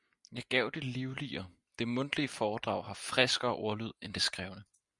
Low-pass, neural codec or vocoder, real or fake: 10.8 kHz; none; real